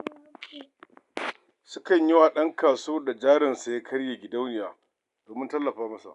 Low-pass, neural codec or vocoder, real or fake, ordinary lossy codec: 10.8 kHz; none; real; none